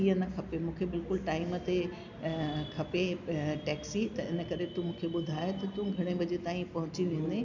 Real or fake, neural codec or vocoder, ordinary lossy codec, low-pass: real; none; none; 7.2 kHz